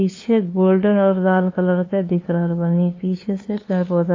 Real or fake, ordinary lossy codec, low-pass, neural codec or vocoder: fake; AAC, 32 kbps; 7.2 kHz; codec, 16 kHz, 2 kbps, FunCodec, trained on LibriTTS, 25 frames a second